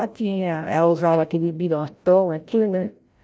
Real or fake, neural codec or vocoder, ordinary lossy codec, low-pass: fake; codec, 16 kHz, 0.5 kbps, FreqCodec, larger model; none; none